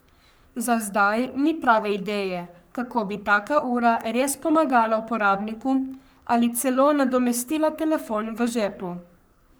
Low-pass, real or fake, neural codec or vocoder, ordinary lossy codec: none; fake; codec, 44.1 kHz, 3.4 kbps, Pupu-Codec; none